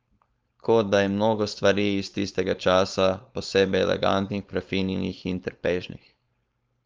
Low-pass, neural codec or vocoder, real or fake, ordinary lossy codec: 7.2 kHz; codec, 16 kHz, 4.8 kbps, FACodec; fake; Opus, 24 kbps